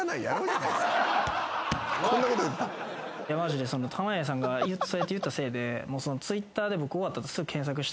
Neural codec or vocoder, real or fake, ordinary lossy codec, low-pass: none; real; none; none